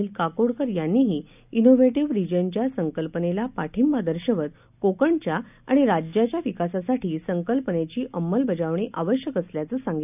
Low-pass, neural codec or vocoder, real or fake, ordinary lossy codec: 3.6 kHz; none; real; none